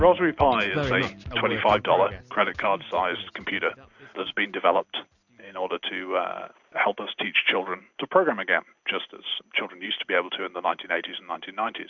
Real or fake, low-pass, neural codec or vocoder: real; 7.2 kHz; none